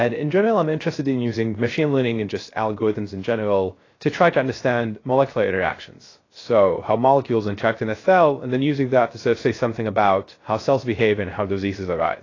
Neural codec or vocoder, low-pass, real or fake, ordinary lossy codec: codec, 16 kHz, 0.3 kbps, FocalCodec; 7.2 kHz; fake; AAC, 32 kbps